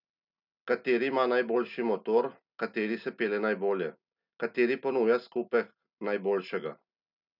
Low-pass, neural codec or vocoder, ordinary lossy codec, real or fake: 5.4 kHz; none; none; real